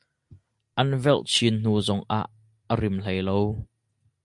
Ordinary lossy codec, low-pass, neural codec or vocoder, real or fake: MP3, 64 kbps; 10.8 kHz; none; real